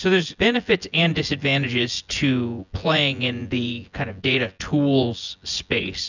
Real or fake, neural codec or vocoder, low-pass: fake; vocoder, 24 kHz, 100 mel bands, Vocos; 7.2 kHz